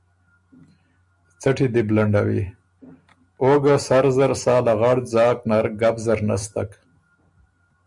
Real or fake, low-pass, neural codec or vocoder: real; 10.8 kHz; none